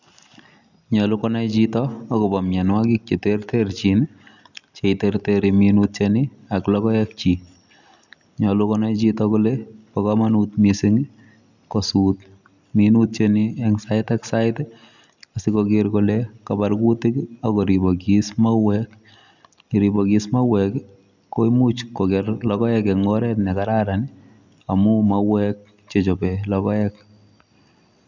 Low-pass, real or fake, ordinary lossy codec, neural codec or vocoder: 7.2 kHz; real; none; none